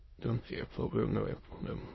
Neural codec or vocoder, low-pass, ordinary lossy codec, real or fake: autoencoder, 22.05 kHz, a latent of 192 numbers a frame, VITS, trained on many speakers; 7.2 kHz; MP3, 24 kbps; fake